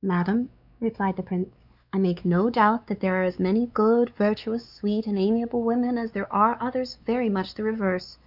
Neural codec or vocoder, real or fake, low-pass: codec, 16 kHz, 4 kbps, X-Codec, WavLM features, trained on Multilingual LibriSpeech; fake; 5.4 kHz